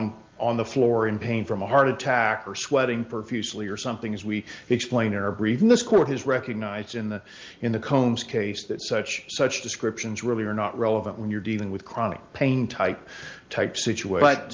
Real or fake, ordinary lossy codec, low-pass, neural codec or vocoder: real; Opus, 32 kbps; 7.2 kHz; none